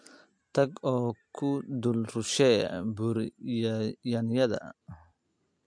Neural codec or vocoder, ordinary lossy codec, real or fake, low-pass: none; MP3, 64 kbps; real; 9.9 kHz